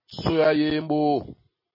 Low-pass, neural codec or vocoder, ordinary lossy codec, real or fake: 5.4 kHz; none; MP3, 24 kbps; real